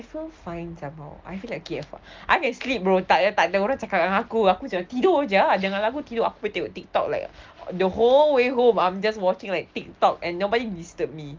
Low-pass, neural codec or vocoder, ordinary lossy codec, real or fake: 7.2 kHz; none; Opus, 32 kbps; real